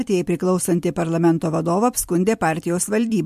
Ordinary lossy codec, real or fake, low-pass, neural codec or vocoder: MP3, 64 kbps; fake; 14.4 kHz; vocoder, 44.1 kHz, 128 mel bands every 256 samples, BigVGAN v2